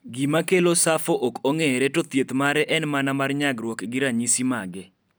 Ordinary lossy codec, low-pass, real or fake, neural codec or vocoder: none; none; real; none